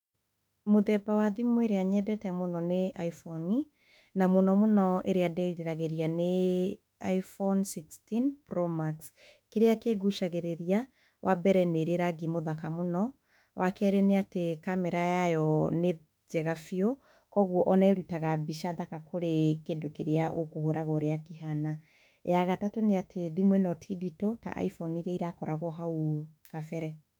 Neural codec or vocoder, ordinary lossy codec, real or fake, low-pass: autoencoder, 48 kHz, 32 numbers a frame, DAC-VAE, trained on Japanese speech; none; fake; 19.8 kHz